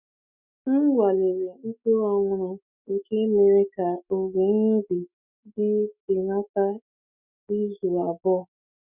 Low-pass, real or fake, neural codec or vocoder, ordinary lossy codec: 3.6 kHz; fake; autoencoder, 48 kHz, 128 numbers a frame, DAC-VAE, trained on Japanese speech; none